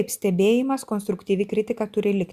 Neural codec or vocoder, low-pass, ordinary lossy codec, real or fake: none; 14.4 kHz; Opus, 64 kbps; real